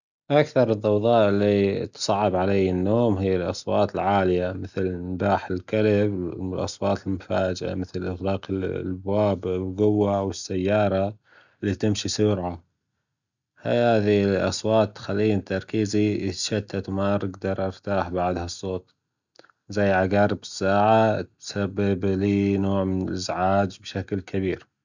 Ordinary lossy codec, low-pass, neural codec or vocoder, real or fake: none; 7.2 kHz; none; real